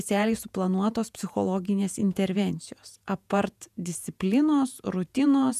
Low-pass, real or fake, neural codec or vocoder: 14.4 kHz; fake; vocoder, 48 kHz, 128 mel bands, Vocos